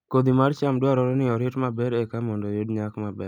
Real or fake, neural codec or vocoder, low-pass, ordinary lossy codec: real; none; 19.8 kHz; none